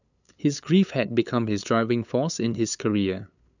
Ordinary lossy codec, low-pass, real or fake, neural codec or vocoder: none; 7.2 kHz; fake; codec, 16 kHz, 8 kbps, FunCodec, trained on LibriTTS, 25 frames a second